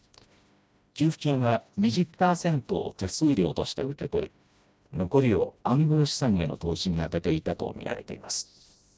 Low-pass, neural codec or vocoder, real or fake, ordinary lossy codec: none; codec, 16 kHz, 1 kbps, FreqCodec, smaller model; fake; none